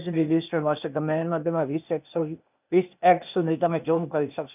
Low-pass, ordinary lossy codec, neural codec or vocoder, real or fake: 3.6 kHz; none; codec, 16 kHz, 0.8 kbps, ZipCodec; fake